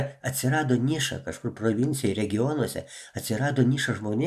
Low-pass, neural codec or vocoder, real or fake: 14.4 kHz; none; real